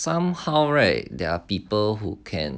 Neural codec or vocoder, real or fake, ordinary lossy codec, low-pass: none; real; none; none